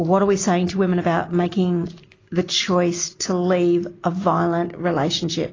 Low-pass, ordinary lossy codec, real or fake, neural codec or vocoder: 7.2 kHz; AAC, 32 kbps; real; none